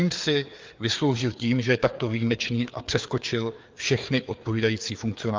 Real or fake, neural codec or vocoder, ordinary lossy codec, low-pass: fake; codec, 16 kHz, 4 kbps, FreqCodec, larger model; Opus, 32 kbps; 7.2 kHz